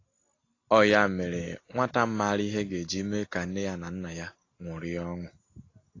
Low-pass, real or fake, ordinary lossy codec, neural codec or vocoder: 7.2 kHz; real; AAC, 32 kbps; none